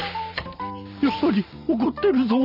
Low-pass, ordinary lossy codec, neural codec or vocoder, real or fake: 5.4 kHz; none; vocoder, 44.1 kHz, 128 mel bands every 512 samples, BigVGAN v2; fake